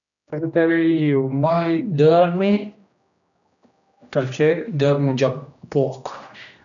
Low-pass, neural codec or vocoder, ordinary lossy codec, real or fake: 7.2 kHz; codec, 16 kHz, 1 kbps, X-Codec, HuBERT features, trained on general audio; none; fake